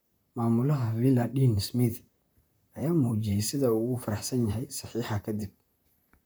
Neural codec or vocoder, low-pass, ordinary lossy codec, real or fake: vocoder, 44.1 kHz, 128 mel bands, Pupu-Vocoder; none; none; fake